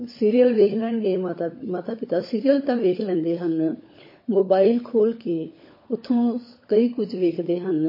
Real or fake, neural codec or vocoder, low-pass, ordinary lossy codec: fake; codec, 16 kHz, 16 kbps, FunCodec, trained on LibriTTS, 50 frames a second; 5.4 kHz; MP3, 24 kbps